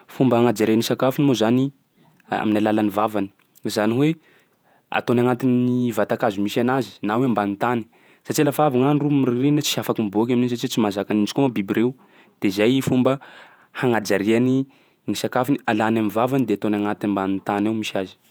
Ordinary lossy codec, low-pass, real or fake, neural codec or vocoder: none; none; fake; vocoder, 48 kHz, 128 mel bands, Vocos